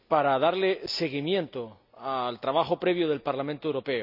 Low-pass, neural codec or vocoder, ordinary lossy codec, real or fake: 5.4 kHz; none; none; real